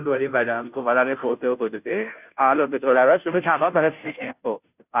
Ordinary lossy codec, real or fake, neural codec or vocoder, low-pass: none; fake; codec, 16 kHz, 0.5 kbps, FunCodec, trained on Chinese and English, 25 frames a second; 3.6 kHz